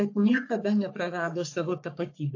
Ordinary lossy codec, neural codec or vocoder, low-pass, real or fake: AAC, 48 kbps; codec, 44.1 kHz, 3.4 kbps, Pupu-Codec; 7.2 kHz; fake